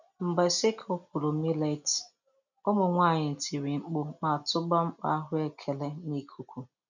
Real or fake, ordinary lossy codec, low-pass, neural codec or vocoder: real; none; 7.2 kHz; none